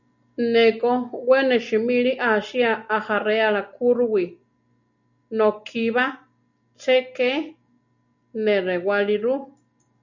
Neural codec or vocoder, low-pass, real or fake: none; 7.2 kHz; real